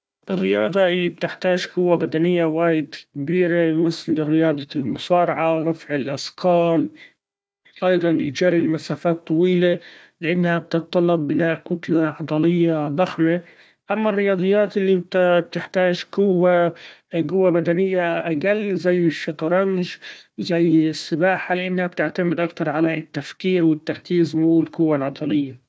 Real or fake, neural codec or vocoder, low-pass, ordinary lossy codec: fake; codec, 16 kHz, 1 kbps, FunCodec, trained on Chinese and English, 50 frames a second; none; none